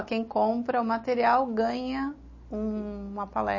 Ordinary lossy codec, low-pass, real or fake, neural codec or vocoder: MP3, 32 kbps; 7.2 kHz; real; none